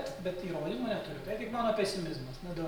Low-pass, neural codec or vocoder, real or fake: 19.8 kHz; none; real